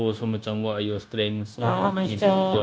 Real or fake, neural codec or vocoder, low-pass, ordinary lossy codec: fake; codec, 16 kHz, 0.9 kbps, LongCat-Audio-Codec; none; none